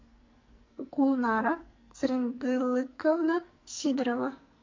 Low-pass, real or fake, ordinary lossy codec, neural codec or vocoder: 7.2 kHz; fake; MP3, 48 kbps; codec, 44.1 kHz, 2.6 kbps, SNAC